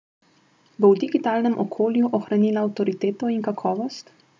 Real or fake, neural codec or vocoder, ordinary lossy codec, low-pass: real; none; none; none